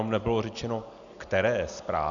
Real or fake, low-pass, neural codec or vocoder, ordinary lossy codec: real; 7.2 kHz; none; Opus, 64 kbps